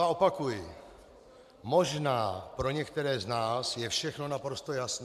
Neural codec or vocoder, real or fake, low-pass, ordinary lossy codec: vocoder, 44.1 kHz, 128 mel bands every 512 samples, BigVGAN v2; fake; 14.4 kHz; MP3, 96 kbps